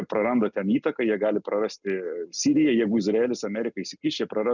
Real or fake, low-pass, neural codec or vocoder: real; 7.2 kHz; none